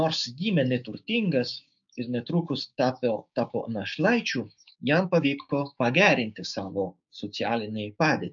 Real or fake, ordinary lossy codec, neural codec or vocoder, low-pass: fake; MP3, 96 kbps; codec, 16 kHz, 6 kbps, DAC; 7.2 kHz